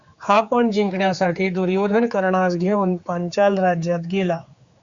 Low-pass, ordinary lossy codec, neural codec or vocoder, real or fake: 7.2 kHz; Opus, 64 kbps; codec, 16 kHz, 4 kbps, X-Codec, HuBERT features, trained on general audio; fake